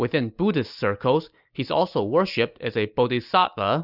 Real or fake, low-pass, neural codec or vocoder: real; 5.4 kHz; none